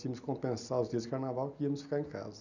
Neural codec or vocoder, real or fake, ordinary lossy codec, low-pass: none; real; none; 7.2 kHz